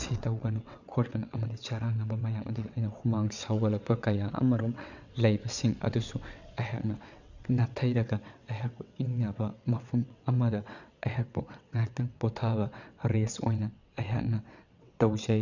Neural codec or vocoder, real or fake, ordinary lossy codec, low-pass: vocoder, 22.05 kHz, 80 mel bands, WaveNeXt; fake; none; 7.2 kHz